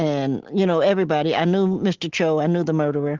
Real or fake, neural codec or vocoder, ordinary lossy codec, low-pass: real; none; Opus, 16 kbps; 7.2 kHz